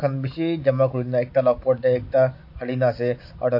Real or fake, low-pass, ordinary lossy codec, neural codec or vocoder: real; 5.4 kHz; MP3, 32 kbps; none